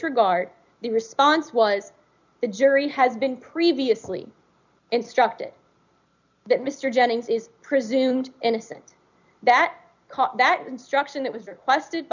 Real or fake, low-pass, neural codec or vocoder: real; 7.2 kHz; none